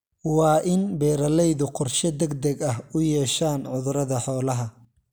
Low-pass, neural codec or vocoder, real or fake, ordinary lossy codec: none; none; real; none